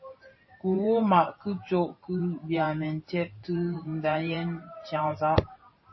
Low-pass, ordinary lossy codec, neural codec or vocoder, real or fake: 7.2 kHz; MP3, 24 kbps; vocoder, 44.1 kHz, 128 mel bands every 512 samples, BigVGAN v2; fake